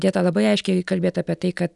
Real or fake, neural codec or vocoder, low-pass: real; none; 10.8 kHz